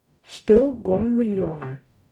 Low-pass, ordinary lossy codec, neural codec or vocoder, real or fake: 19.8 kHz; none; codec, 44.1 kHz, 0.9 kbps, DAC; fake